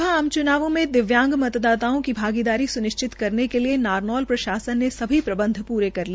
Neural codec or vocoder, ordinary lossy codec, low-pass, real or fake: none; none; none; real